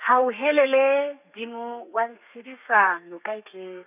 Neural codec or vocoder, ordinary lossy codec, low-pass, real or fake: codec, 32 kHz, 1.9 kbps, SNAC; none; 3.6 kHz; fake